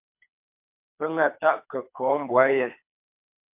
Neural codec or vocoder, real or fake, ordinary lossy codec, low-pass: codec, 24 kHz, 3 kbps, HILCodec; fake; MP3, 32 kbps; 3.6 kHz